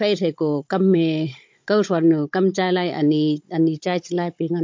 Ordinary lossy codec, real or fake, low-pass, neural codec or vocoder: MP3, 48 kbps; fake; 7.2 kHz; codec, 16 kHz, 16 kbps, FunCodec, trained on Chinese and English, 50 frames a second